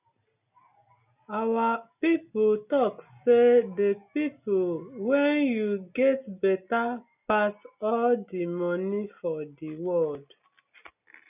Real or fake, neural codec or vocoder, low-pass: real; none; 3.6 kHz